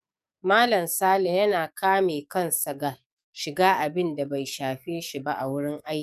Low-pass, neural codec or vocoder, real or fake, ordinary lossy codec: 14.4 kHz; codec, 44.1 kHz, 7.8 kbps, DAC; fake; none